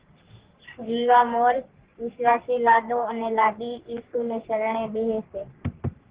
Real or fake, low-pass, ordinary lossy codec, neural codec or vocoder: fake; 3.6 kHz; Opus, 16 kbps; codec, 44.1 kHz, 3.4 kbps, Pupu-Codec